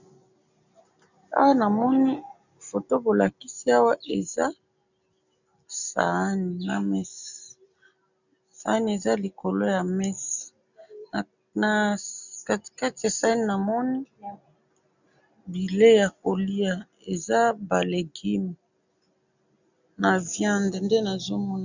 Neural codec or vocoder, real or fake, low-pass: none; real; 7.2 kHz